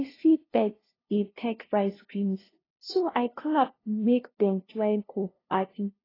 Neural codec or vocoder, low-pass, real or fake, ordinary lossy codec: codec, 16 kHz, 0.5 kbps, FunCodec, trained on LibriTTS, 25 frames a second; 5.4 kHz; fake; AAC, 24 kbps